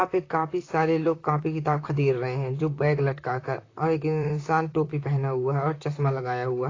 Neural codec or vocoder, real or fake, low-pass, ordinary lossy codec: vocoder, 44.1 kHz, 128 mel bands, Pupu-Vocoder; fake; 7.2 kHz; AAC, 32 kbps